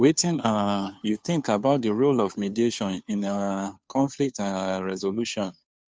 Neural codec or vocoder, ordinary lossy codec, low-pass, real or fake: codec, 16 kHz, 2 kbps, FunCodec, trained on Chinese and English, 25 frames a second; none; none; fake